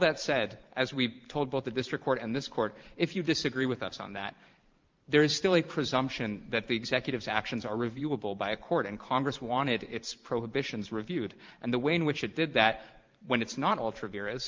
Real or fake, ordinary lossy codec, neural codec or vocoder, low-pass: fake; Opus, 32 kbps; vocoder, 44.1 kHz, 128 mel bands every 512 samples, BigVGAN v2; 7.2 kHz